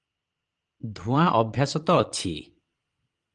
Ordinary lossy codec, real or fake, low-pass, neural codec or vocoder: Opus, 24 kbps; fake; 9.9 kHz; vocoder, 22.05 kHz, 80 mel bands, Vocos